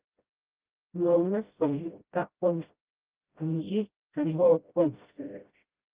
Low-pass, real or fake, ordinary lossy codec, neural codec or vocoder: 3.6 kHz; fake; Opus, 32 kbps; codec, 16 kHz, 0.5 kbps, FreqCodec, smaller model